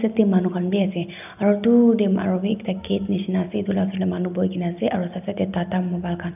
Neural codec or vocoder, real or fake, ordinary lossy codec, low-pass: none; real; none; 3.6 kHz